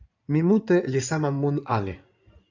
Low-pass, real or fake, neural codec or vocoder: 7.2 kHz; fake; codec, 16 kHz in and 24 kHz out, 2.2 kbps, FireRedTTS-2 codec